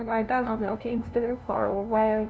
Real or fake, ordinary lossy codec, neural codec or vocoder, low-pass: fake; none; codec, 16 kHz, 0.5 kbps, FunCodec, trained on LibriTTS, 25 frames a second; none